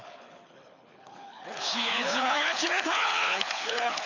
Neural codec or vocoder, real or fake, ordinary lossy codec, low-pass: codec, 24 kHz, 6 kbps, HILCodec; fake; none; 7.2 kHz